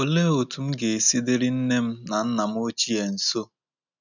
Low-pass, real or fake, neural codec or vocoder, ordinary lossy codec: 7.2 kHz; real; none; none